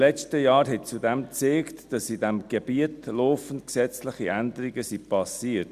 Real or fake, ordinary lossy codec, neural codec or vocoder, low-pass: real; Opus, 64 kbps; none; 14.4 kHz